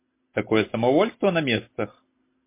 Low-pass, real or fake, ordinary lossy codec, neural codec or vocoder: 3.6 kHz; real; MP3, 24 kbps; none